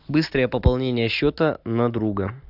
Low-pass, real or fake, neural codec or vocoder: 5.4 kHz; real; none